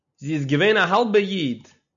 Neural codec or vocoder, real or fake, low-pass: none; real; 7.2 kHz